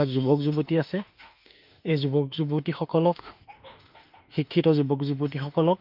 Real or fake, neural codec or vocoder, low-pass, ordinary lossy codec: fake; autoencoder, 48 kHz, 32 numbers a frame, DAC-VAE, trained on Japanese speech; 5.4 kHz; Opus, 24 kbps